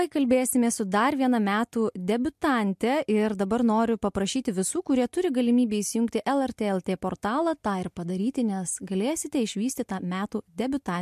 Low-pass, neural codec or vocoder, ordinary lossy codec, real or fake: 14.4 kHz; none; MP3, 64 kbps; real